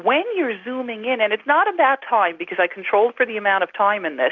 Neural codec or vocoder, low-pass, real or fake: none; 7.2 kHz; real